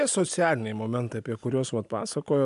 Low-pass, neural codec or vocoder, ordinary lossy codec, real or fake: 10.8 kHz; none; AAC, 96 kbps; real